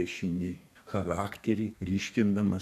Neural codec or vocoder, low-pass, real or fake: codec, 32 kHz, 1.9 kbps, SNAC; 14.4 kHz; fake